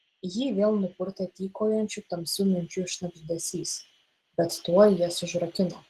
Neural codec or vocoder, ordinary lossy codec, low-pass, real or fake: none; Opus, 16 kbps; 14.4 kHz; real